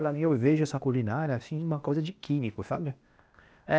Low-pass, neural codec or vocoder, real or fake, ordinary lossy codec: none; codec, 16 kHz, 0.8 kbps, ZipCodec; fake; none